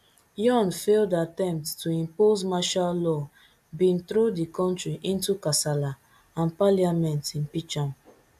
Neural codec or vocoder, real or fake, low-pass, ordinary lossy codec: none; real; 14.4 kHz; AAC, 96 kbps